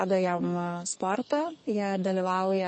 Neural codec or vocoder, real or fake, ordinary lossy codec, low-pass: codec, 44.1 kHz, 2.6 kbps, SNAC; fake; MP3, 32 kbps; 10.8 kHz